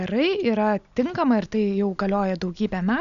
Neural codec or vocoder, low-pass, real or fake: none; 7.2 kHz; real